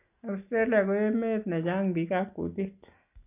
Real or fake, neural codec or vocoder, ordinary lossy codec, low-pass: real; none; none; 3.6 kHz